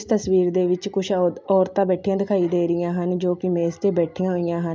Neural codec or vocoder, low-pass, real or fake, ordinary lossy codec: none; none; real; none